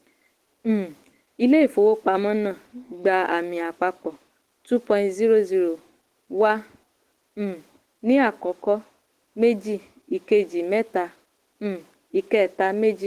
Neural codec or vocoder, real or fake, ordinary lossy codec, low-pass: autoencoder, 48 kHz, 128 numbers a frame, DAC-VAE, trained on Japanese speech; fake; Opus, 16 kbps; 14.4 kHz